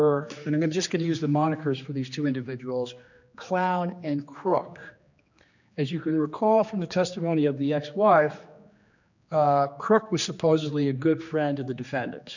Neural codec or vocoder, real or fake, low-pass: codec, 16 kHz, 2 kbps, X-Codec, HuBERT features, trained on general audio; fake; 7.2 kHz